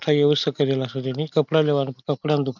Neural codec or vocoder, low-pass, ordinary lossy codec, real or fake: none; 7.2 kHz; none; real